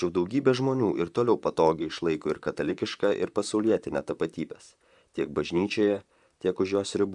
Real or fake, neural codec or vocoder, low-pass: fake; vocoder, 44.1 kHz, 128 mel bands, Pupu-Vocoder; 10.8 kHz